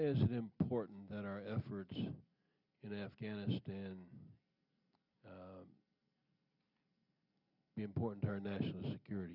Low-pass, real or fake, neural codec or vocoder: 5.4 kHz; real; none